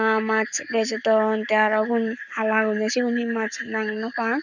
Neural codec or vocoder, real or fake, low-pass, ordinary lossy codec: codec, 44.1 kHz, 7.8 kbps, Pupu-Codec; fake; 7.2 kHz; none